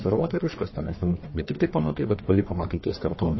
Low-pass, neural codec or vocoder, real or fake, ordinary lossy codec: 7.2 kHz; codec, 44.1 kHz, 1.7 kbps, Pupu-Codec; fake; MP3, 24 kbps